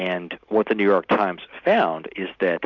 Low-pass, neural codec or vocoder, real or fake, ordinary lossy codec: 7.2 kHz; none; real; MP3, 64 kbps